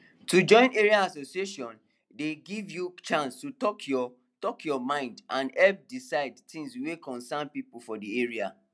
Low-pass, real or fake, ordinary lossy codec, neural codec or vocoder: none; real; none; none